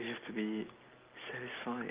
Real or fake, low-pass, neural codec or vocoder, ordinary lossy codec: real; 3.6 kHz; none; Opus, 32 kbps